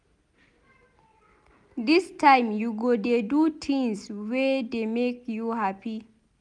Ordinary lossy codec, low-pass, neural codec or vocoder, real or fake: none; 10.8 kHz; none; real